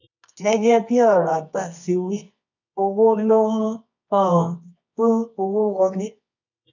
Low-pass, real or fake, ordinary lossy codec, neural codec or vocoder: 7.2 kHz; fake; none; codec, 24 kHz, 0.9 kbps, WavTokenizer, medium music audio release